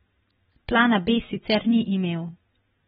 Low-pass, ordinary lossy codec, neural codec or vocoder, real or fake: 10.8 kHz; AAC, 16 kbps; none; real